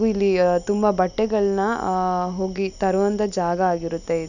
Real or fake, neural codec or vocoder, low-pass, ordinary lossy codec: real; none; 7.2 kHz; none